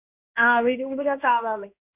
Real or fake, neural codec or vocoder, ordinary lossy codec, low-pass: fake; codec, 16 kHz in and 24 kHz out, 1 kbps, XY-Tokenizer; none; 3.6 kHz